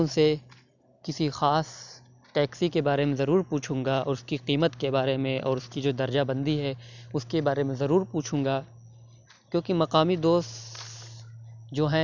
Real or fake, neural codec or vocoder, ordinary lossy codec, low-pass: real; none; none; 7.2 kHz